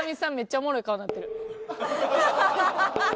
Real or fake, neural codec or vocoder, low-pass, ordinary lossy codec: real; none; none; none